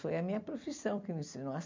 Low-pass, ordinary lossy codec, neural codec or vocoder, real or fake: 7.2 kHz; MP3, 64 kbps; vocoder, 44.1 kHz, 128 mel bands every 256 samples, BigVGAN v2; fake